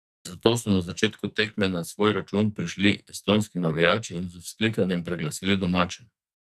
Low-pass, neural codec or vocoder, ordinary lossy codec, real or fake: 14.4 kHz; codec, 44.1 kHz, 2.6 kbps, SNAC; none; fake